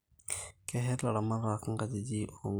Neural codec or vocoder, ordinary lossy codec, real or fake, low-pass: none; none; real; none